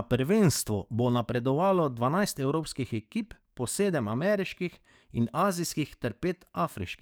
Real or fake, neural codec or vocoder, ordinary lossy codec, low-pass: fake; codec, 44.1 kHz, 7.8 kbps, DAC; none; none